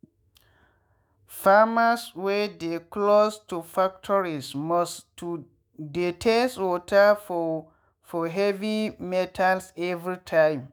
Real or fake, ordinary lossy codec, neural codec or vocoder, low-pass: fake; none; autoencoder, 48 kHz, 128 numbers a frame, DAC-VAE, trained on Japanese speech; none